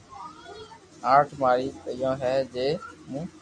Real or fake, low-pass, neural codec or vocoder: real; 9.9 kHz; none